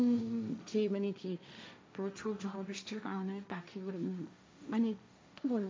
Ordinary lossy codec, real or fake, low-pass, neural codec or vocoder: none; fake; none; codec, 16 kHz, 1.1 kbps, Voila-Tokenizer